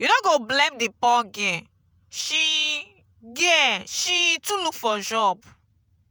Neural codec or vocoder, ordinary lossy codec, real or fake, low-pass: vocoder, 48 kHz, 128 mel bands, Vocos; none; fake; none